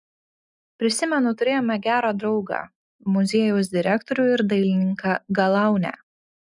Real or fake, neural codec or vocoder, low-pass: real; none; 10.8 kHz